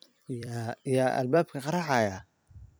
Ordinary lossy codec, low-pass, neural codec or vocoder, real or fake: none; none; vocoder, 44.1 kHz, 128 mel bands every 256 samples, BigVGAN v2; fake